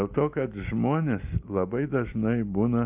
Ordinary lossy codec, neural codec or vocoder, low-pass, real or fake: Opus, 24 kbps; none; 3.6 kHz; real